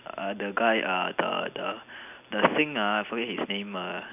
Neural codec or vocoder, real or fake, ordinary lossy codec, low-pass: none; real; none; 3.6 kHz